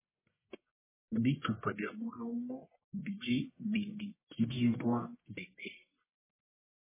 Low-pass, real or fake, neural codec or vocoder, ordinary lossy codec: 3.6 kHz; fake; codec, 44.1 kHz, 1.7 kbps, Pupu-Codec; MP3, 16 kbps